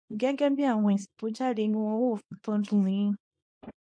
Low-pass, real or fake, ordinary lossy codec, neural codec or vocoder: 9.9 kHz; fake; MP3, 48 kbps; codec, 24 kHz, 0.9 kbps, WavTokenizer, small release